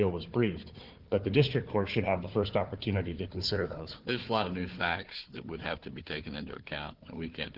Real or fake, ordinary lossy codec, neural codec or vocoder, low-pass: fake; Opus, 16 kbps; codec, 16 kHz, 4 kbps, FunCodec, trained on Chinese and English, 50 frames a second; 5.4 kHz